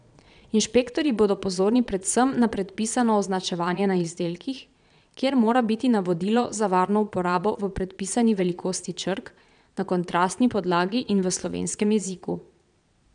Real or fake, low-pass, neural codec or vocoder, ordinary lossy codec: fake; 9.9 kHz; vocoder, 22.05 kHz, 80 mel bands, Vocos; none